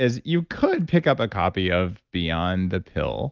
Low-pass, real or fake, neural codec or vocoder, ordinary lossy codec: 7.2 kHz; real; none; Opus, 24 kbps